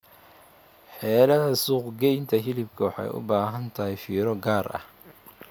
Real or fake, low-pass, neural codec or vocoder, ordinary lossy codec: real; none; none; none